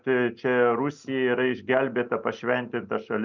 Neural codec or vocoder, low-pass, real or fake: none; 7.2 kHz; real